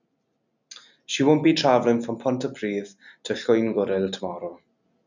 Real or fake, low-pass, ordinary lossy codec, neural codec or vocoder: real; 7.2 kHz; none; none